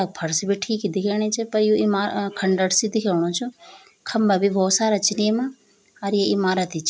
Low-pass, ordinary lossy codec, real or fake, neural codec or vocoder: none; none; real; none